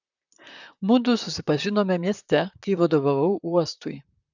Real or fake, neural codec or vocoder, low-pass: fake; vocoder, 44.1 kHz, 128 mel bands, Pupu-Vocoder; 7.2 kHz